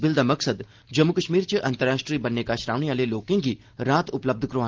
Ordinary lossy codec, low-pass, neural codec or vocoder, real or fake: Opus, 16 kbps; 7.2 kHz; none; real